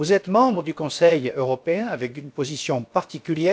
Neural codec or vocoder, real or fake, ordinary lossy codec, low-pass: codec, 16 kHz, about 1 kbps, DyCAST, with the encoder's durations; fake; none; none